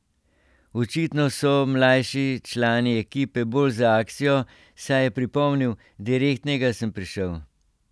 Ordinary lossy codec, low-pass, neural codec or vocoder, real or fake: none; none; none; real